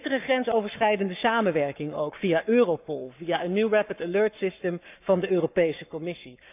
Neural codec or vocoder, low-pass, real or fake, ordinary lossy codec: codec, 44.1 kHz, 7.8 kbps, Pupu-Codec; 3.6 kHz; fake; none